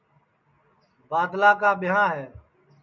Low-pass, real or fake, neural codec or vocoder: 7.2 kHz; real; none